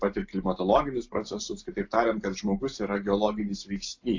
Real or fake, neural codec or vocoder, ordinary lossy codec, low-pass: real; none; AAC, 48 kbps; 7.2 kHz